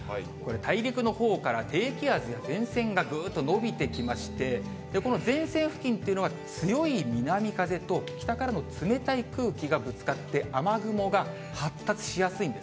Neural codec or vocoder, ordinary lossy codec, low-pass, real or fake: none; none; none; real